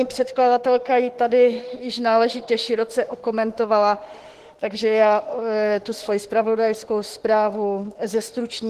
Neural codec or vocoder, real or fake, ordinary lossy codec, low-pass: autoencoder, 48 kHz, 32 numbers a frame, DAC-VAE, trained on Japanese speech; fake; Opus, 16 kbps; 14.4 kHz